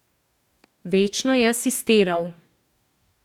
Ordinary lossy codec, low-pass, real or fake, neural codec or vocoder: none; 19.8 kHz; fake; codec, 44.1 kHz, 2.6 kbps, DAC